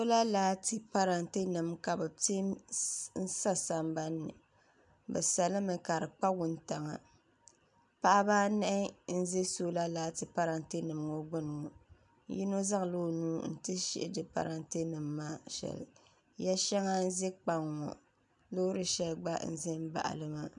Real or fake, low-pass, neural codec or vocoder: real; 10.8 kHz; none